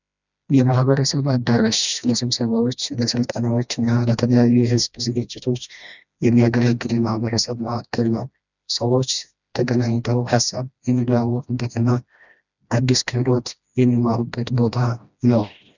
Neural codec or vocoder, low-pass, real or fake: codec, 16 kHz, 1 kbps, FreqCodec, smaller model; 7.2 kHz; fake